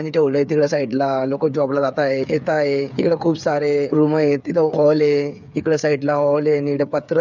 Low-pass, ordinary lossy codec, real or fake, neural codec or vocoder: 7.2 kHz; none; fake; codec, 16 kHz, 8 kbps, FreqCodec, smaller model